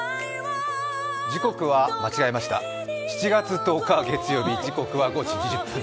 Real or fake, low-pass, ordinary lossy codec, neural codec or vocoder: real; none; none; none